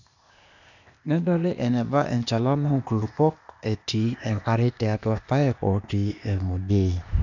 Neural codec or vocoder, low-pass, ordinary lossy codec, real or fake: codec, 16 kHz, 0.8 kbps, ZipCodec; 7.2 kHz; none; fake